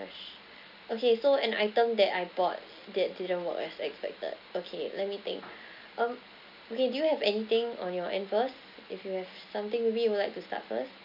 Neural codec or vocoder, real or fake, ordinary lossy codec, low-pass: none; real; none; 5.4 kHz